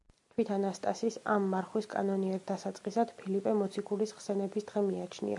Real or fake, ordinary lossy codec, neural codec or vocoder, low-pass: real; MP3, 96 kbps; none; 10.8 kHz